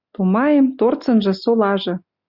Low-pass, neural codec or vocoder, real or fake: 5.4 kHz; none; real